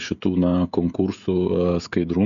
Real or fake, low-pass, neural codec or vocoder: fake; 7.2 kHz; codec, 16 kHz, 16 kbps, FreqCodec, smaller model